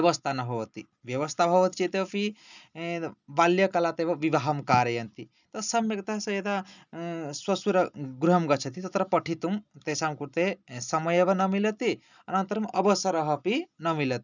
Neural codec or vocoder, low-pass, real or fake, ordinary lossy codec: none; 7.2 kHz; real; none